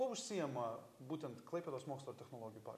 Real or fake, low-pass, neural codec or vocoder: real; 14.4 kHz; none